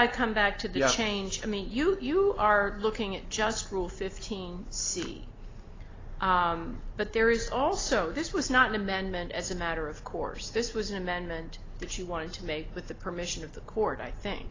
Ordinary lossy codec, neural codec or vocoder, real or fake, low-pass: AAC, 32 kbps; none; real; 7.2 kHz